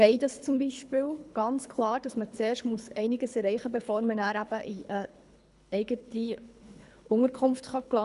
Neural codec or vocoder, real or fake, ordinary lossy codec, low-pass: codec, 24 kHz, 3 kbps, HILCodec; fake; none; 10.8 kHz